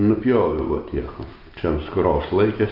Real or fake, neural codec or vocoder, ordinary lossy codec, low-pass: real; none; Opus, 24 kbps; 5.4 kHz